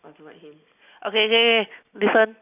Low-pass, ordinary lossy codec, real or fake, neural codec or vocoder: 3.6 kHz; AAC, 32 kbps; real; none